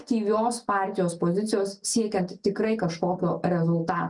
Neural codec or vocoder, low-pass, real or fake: none; 10.8 kHz; real